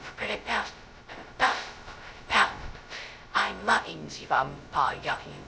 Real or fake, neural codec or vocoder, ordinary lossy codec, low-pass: fake; codec, 16 kHz, 0.2 kbps, FocalCodec; none; none